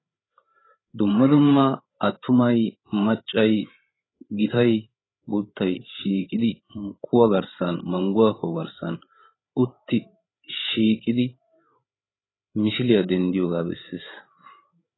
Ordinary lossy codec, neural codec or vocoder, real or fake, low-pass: AAC, 16 kbps; codec, 16 kHz, 8 kbps, FreqCodec, larger model; fake; 7.2 kHz